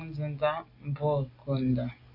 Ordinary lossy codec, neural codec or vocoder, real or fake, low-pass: AAC, 32 kbps; none; real; 5.4 kHz